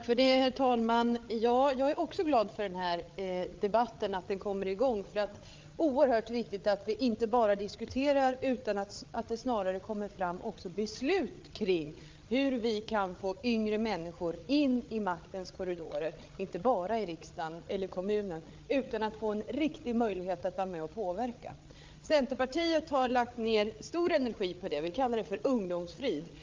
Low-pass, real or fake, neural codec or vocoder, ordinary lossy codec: 7.2 kHz; fake; codec, 16 kHz, 8 kbps, FreqCodec, larger model; Opus, 24 kbps